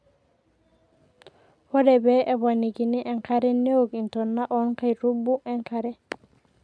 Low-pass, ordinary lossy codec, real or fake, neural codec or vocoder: none; none; real; none